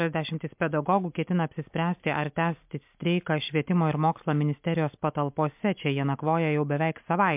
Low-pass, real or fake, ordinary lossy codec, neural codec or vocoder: 3.6 kHz; real; MP3, 32 kbps; none